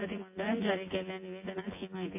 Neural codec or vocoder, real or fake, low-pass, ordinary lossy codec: vocoder, 24 kHz, 100 mel bands, Vocos; fake; 3.6 kHz; MP3, 24 kbps